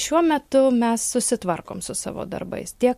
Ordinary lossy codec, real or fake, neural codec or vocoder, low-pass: MP3, 64 kbps; real; none; 14.4 kHz